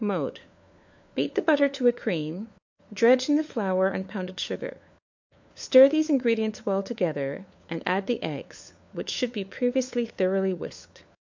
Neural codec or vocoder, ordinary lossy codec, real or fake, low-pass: codec, 16 kHz, 4 kbps, FunCodec, trained on LibriTTS, 50 frames a second; MP3, 64 kbps; fake; 7.2 kHz